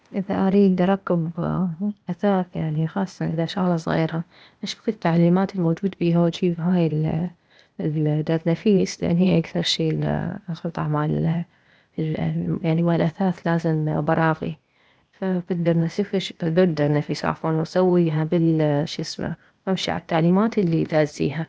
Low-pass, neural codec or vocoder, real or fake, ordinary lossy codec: none; codec, 16 kHz, 0.8 kbps, ZipCodec; fake; none